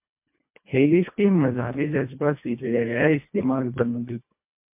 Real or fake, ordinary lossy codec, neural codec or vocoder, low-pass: fake; MP3, 32 kbps; codec, 24 kHz, 1.5 kbps, HILCodec; 3.6 kHz